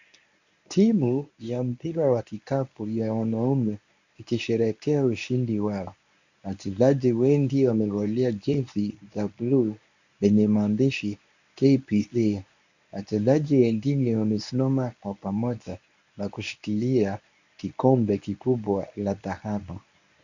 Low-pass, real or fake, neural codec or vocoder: 7.2 kHz; fake; codec, 24 kHz, 0.9 kbps, WavTokenizer, medium speech release version 1